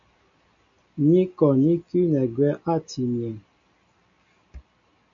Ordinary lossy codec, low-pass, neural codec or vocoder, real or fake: MP3, 64 kbps; 7.2 kHz; none; real